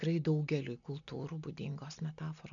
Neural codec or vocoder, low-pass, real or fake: none; 7.2 kHz; real